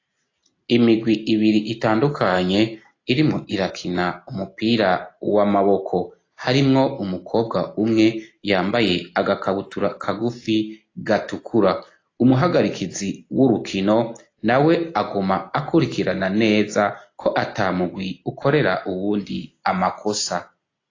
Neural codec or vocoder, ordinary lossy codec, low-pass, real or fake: none; AAC, 32 kbps; 7.2 kHz; real